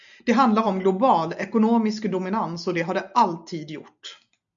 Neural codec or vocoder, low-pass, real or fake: none; 7.2 kHz; real